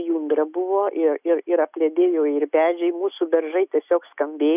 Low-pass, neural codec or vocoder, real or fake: 3.6 kHz; none; real